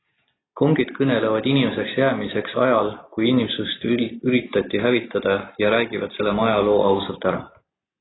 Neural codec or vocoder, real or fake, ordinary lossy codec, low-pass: none; real; AAC, 16 kbps; 7.2 kHz